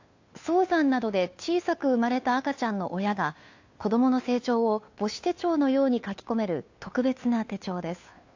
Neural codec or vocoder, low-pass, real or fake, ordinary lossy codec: codec, 16 kHz, 2 kbps, FunCodec, trained on Chinese and English, 25 frames a second; 7.2 kHz; fake; AAC, 48 kbps